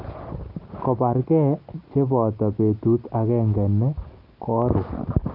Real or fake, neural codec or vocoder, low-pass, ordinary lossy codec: real; none; 5.4 kHz; Opus, 32 kbps